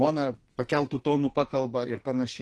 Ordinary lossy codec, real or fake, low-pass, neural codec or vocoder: Opus, 16 kbps; fake; 10.8 kHz; codec, 44.1 kHz, 2.6 kbps, SNAC